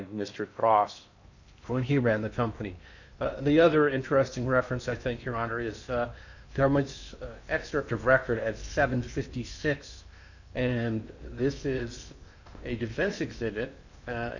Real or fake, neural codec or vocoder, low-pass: fake; codec, 16 kHz in and 24 kHz out, 0.8 kbps, FocalCodec, streaming, 65536 codes; 7.2 kHz